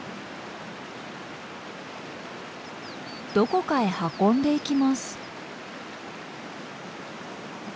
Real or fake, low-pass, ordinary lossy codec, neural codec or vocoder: real; none; none; none